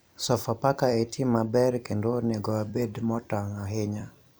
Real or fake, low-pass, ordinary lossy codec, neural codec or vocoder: fake; none; none; vocoder, 44.1 kHz, 128 mel bands every 256 samples, BigVGAN v2